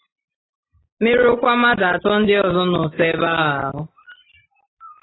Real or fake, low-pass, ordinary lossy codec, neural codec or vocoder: real; 7.2 kHz; AAC, 16 kbps; none